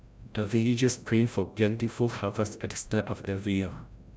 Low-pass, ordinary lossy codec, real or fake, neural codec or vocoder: none; none; fake; codec, 16 kHz, 0.5 kbps, FreqCodec, larger model